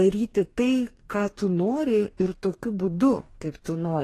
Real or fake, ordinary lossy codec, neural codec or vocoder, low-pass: fake; AAC, 48 kbps; codec, 44.1 kHz, 2.6 kbps, DAC; 14.4 kHz